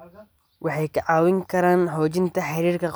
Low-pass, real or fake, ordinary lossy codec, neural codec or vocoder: none; real; none; none